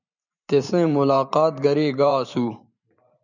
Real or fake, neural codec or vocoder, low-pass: fake; vocoder, 44.1 kHz, 128 mel bands every 512 samples, BigVGAN v2; 7.2 kHz